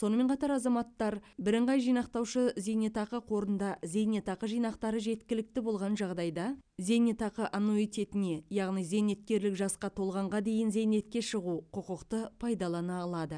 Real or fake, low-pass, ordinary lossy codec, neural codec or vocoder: real; 9.9 kHz; none; none